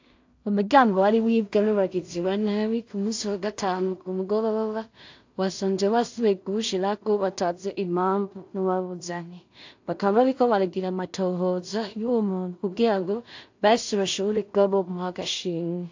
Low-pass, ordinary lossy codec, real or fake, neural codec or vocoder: 7.2 kHz; AAC, 48 kbps; fake; codec, 16 kHz in and 24 kHz out, 0.4 kbps, LongCat-Audio-Codec, two codebook decoder